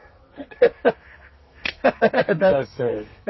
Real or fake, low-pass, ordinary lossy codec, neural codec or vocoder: fake; 7.2 kHz; MP3, 24 kbps; codec, 32 kHz, 1.9 kbps, SNAC